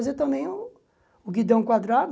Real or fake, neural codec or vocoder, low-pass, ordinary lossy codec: real; none; none; none